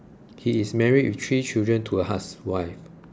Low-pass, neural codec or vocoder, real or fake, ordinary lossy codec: none; none; real; none